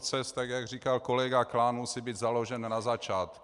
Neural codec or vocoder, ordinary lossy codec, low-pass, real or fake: none; Opus, 64 kbps; 10.8 kHz; real